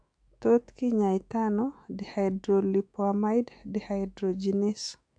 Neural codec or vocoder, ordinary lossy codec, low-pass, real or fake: autoencoder, 48 kHz, 128 numbers a frame, DAC-VAE, trained on Japanese speech; MP3, 64 kbps; 9.9 kHz; fake